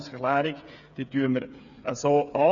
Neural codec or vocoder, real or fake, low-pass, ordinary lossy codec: codec, 16 kHz, 8 kbps, FreqCodec, smaller model; fake; 7.2 kHz; none